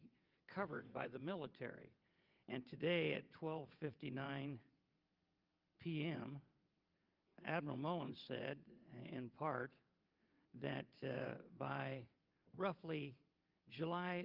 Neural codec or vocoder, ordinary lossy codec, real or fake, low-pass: vocoder, 22.05 kHz, 80 mel bands, WaveNeXt; Opus, 24 kbps; fake; 5.4 kHz